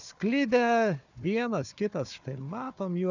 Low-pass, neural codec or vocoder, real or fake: 7.2 kHz; codec, 16 kHz, 4 kbps, FunCodec, trained on Chinese and English, 50 frames a second; fake